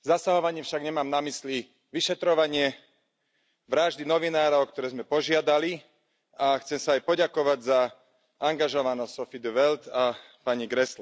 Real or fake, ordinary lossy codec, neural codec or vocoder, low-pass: real; none; none; none